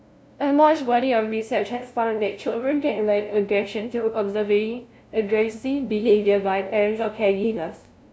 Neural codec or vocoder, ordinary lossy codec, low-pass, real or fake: codec, 16 kHz, 0.5 kbps, FunCodec, trained on LibriTTS, 25 frames a second; none; none; fake